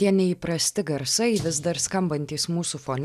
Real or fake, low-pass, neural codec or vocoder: real; 14.4 kHz; none